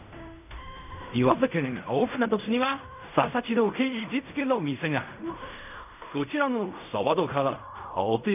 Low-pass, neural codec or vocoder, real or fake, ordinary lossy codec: 3.6 kHz; codec, 16 kHz in and 24 kHz out, 0.4 kbps, LongCat-Audio-Codec, fine tuned four codebook decoder; fake; none